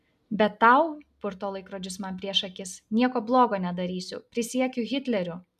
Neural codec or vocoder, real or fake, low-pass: none; real; 14.4 kHz